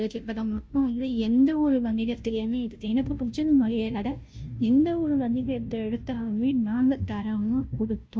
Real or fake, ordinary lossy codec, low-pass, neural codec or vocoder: fake; none; none; codec, 16 kHz, 0.5 kbps, FunCodec, trained on Chinese and English, 25 frames a second